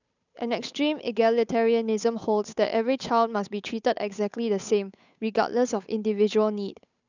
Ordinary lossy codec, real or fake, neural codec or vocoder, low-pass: none; fake; codec, 16 kHz, 8 kbps, FunCodec, trained on Chinese and English, 25 frames a second; 7.2 kHz